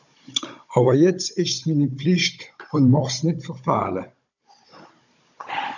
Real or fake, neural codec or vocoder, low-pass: fake; codec, 16 kHz, 16 kbps, FunCodec, trained on Chinese and English, 50 frames a second; 7.2 kHz